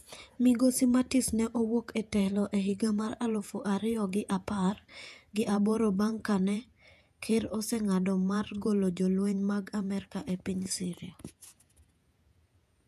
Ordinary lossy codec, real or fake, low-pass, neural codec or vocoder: none; fake; 14.4 kHz; vocoder, 48 kHz, 128 mel bands, Vocos